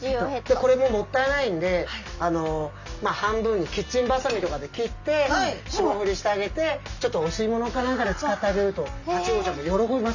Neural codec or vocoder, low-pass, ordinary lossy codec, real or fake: none; 7.2 kHz; none; real